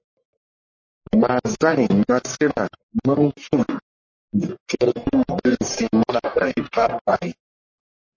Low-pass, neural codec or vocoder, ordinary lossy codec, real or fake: 7.2 kHz; codec, 44.1 kHz, 1.7 kbps, Pupu-Codec; MP3, 32 kbps; fake